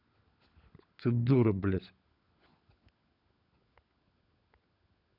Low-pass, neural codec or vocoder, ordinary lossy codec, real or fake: 5.4 kHz; codec, 16 kHz, 16 kbps, FunCodec, trained on LibriTTS, 50 frames a second; none; fake